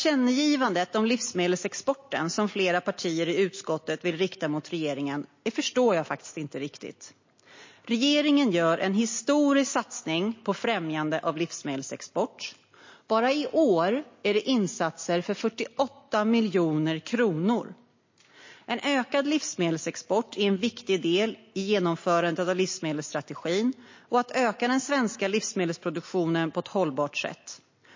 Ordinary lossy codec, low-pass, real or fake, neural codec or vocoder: MP3, 32 kbps; 7.2 kHz; real; none